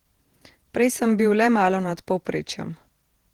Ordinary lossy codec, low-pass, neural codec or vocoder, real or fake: Opus, 16 kbps; 19.8 kHz; vocoder, 48 kHz, 128 mel bands, Vocos; fake